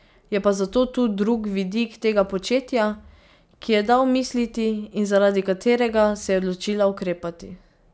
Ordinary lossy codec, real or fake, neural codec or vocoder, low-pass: none; real; none; none